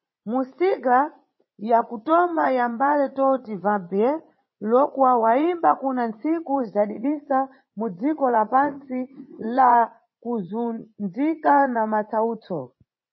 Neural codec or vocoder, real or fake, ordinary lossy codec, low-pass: vocoder, 44.1 kHz, 80 mel bands, Vocos; fake; MP3, 24 kbps; 7.2 kHz